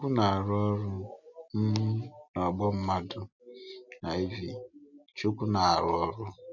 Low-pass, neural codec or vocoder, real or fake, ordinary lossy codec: 7.2 kHz; none; real; none